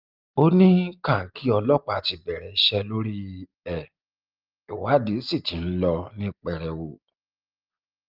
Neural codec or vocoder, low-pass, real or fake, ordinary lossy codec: none; 5.4 kHz; real; Opus, 32 kbps